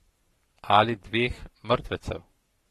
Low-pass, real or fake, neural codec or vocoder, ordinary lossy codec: 19.8 kHz; fake; codec, 44.1 kHz, 7.8 kbps, Pupu-Codec; AAC, 32 kbps